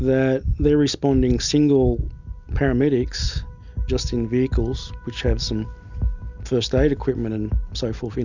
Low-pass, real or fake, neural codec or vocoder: 7.2 kHz; real; none